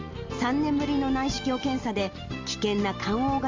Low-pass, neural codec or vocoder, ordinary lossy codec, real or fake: 7.2 kHz; none; Opus, 32 kbps; real